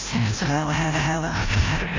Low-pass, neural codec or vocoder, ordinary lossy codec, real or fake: 7.2 kHz; codec, 16 kHz, 0.5 kbps, FreqCodec, larger model; AAC, 32 kbps; fake